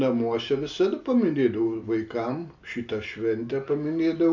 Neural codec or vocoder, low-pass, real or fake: none; 7.2 kHz; real